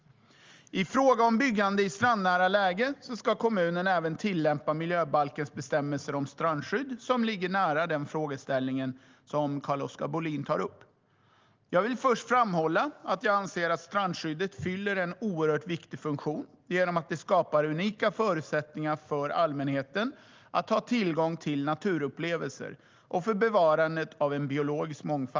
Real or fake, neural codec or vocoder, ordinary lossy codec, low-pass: real; none; Opus, 32 kbps; 7.2 kHz